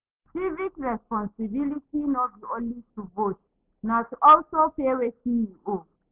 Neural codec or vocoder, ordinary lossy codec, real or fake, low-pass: none; Opus, 32 kbps; real; 3.6 kHz